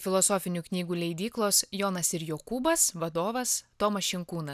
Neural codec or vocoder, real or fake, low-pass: none; real; 14.4 kHz